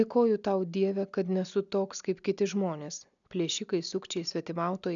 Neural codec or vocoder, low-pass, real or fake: none; 7.2 kHz; real